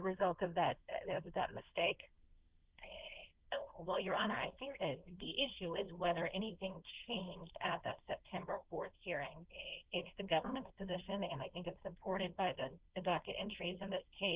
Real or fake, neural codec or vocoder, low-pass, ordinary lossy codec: fake; codec, 16 kHz, 4.8 kbps, FACodec; 3.6 kHz; Opus, 16 kbps